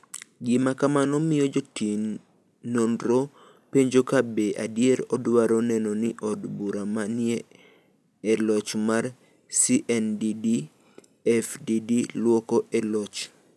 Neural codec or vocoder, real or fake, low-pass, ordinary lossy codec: none; real; none; none